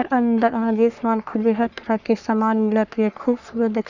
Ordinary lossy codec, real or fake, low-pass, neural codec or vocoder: none; fake; 7.2 kHz; codec, 44.1 kHz, 3.4 kbps, Pupu-Codec